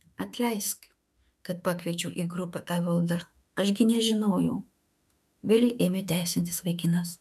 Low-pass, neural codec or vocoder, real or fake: 14.4 kHz; autoencoder, 48 kHz, 32 numbers a frame, DAC-VAE, trained on Japanese speech; fake